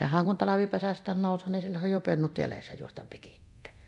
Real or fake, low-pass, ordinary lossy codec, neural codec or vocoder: fake; 10.8 kHz; none; codec, 24 kHz, 0.9 kbps, DualCodec